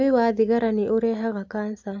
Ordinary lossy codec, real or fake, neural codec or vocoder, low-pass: AAC, 48 kbps; real; none; 7.2 kHz